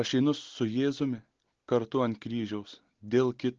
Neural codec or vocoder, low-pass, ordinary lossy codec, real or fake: none; 7.2 kHz; Opus, 16 kbps; real